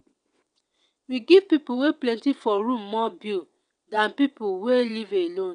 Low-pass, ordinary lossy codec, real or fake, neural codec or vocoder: 9.9 kHz; none; fake; vocoder, 22.05 kHz, 80 mel bands, WaveNeXt